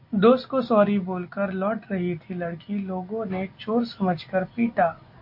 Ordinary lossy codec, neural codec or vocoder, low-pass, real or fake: MP3, 32 kbps; none; 5.4 kHz; real